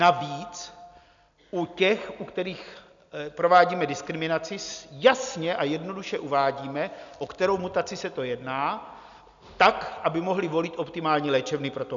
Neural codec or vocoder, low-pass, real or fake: none; 7.2 kHz; real